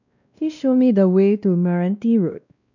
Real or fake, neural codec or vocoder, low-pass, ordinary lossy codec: fake; codec, 16 kHz, 1 kbps, X-Codec, WavLM features, trained on Multilingual LibriSpeech; 7.2 kHz; none